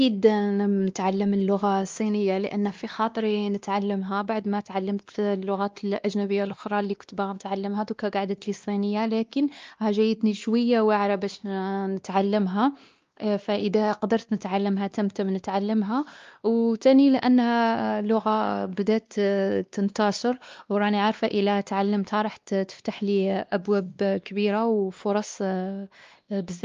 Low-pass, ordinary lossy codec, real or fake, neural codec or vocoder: 7.2 kHz; Opus, 32 kbps; fake; codec, 16 kHz, 2 kbps, X-Codec, WavLM features, trained on Multilingual LibriSpeech